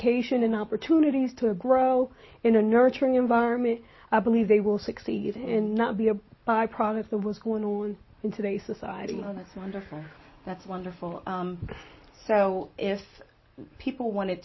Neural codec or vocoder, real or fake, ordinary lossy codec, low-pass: none; real; MP3, 24 kbps; 7.2 kHz